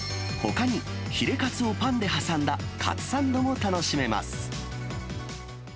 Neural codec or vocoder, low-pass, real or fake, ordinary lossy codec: none; none; real; none